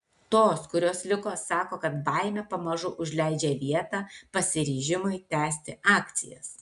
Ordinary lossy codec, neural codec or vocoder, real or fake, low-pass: AAC, 96 kbps; none; real; 10.8 kHz